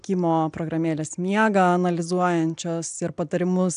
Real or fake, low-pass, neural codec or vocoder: real; 9.9 kHz; none